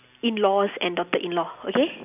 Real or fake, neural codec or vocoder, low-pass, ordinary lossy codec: real; none; 3.6 kHz; none